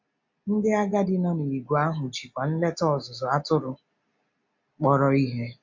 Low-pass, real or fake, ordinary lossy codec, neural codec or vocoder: 7.2 kHz; real; MP3, 48 kbps; none